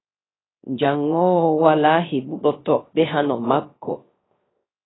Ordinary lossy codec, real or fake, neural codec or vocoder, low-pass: AAC, 16 kbps; fake; codec, 16 kHz, 0.3 kbps, FocalCodec; 7.2 kHz